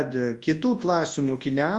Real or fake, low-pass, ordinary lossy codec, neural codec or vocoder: fake; 10.8 kHz; Opus, 32 kbps; codec, 24 kHz, 0.9 kbps, WavTokenizer, large speech release